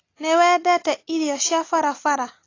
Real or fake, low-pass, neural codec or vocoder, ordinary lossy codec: real; 7.2 kHz; none; AAC, 32 kbps